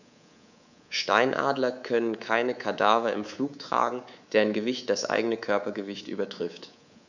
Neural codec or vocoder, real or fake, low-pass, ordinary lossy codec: codec, 24 kHz, 3.1 kbps, DualCodec; fake; 7.2 kHz; none